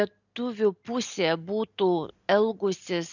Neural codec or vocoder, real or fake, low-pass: none; real; 7.2 kHz